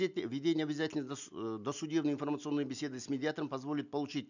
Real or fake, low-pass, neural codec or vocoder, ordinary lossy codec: real; 7.2 kHz; none; none